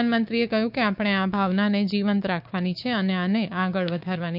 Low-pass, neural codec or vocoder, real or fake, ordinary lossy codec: 5.4 kHz; codec, 16 kHz, 6 kbps, DAC; fake; none